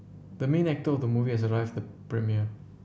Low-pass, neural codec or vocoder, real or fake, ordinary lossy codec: none; none; real; none